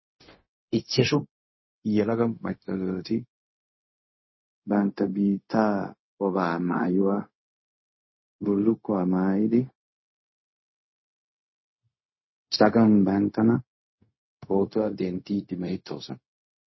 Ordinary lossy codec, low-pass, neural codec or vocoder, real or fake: MP3, 24 kbps; 7.2 kHz; codec, 16 kHz, 0.4 kbps, LongCat-Audio-Codec; fake